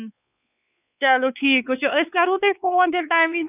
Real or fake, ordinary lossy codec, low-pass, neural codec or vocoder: fake; none; 3.6 kHz; codec, 16 kHz, 4 kbps, X-Codec, WavLM features, trained on Multilingual LibriSpeech